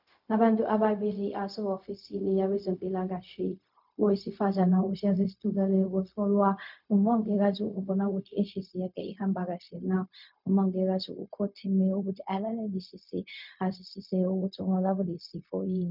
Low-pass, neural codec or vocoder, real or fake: 5.4 kHz; codec, 16 kHz, 0.4 kbps, LongCat-Audio-Codec; fake